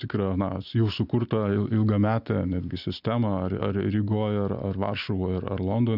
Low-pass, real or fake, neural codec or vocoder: 5.4 kHz; real; none